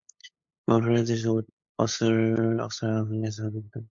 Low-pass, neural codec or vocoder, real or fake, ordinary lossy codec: 7.2 kHz; codec, 16 kHz, 8 kbps, FunCodec, trained on LibriTTS, 25 frames a second; fake; MP3, 48 kbps